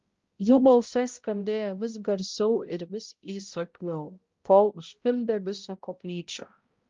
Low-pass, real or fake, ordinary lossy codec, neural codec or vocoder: 7.2 kHz; fake; Opus, 32 kbps; codec, 16 kHz, 0.5 kbps, X-Codec, HuBERT features, trained on balanced general audio